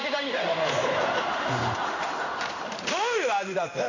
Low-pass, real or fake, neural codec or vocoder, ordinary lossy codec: 7.2 kHz; fake; codec, 16 kHz in and 24 kHz out, 1 kbps, XY-Tokenizer; none